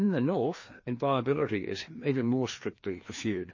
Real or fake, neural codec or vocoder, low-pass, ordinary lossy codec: fake; codec, 16 kHz, 2 kbps, FreqCodec, larger model; 7.2 kHz; MP3, 32 kbps